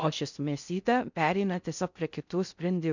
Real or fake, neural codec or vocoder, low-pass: fake; codec, 16 kHz in and 24 kHz out, 0.6 kbps, FocalCodec, streaming, 4096 codes; 7.2 kHz